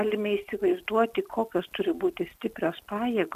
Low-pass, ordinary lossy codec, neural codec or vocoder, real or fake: 14.4 kHz; MP3, 96 kbps; none; real